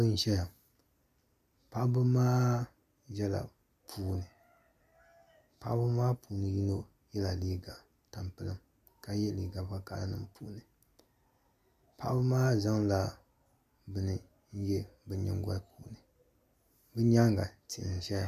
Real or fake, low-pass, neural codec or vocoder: real; 14.4 kHz; none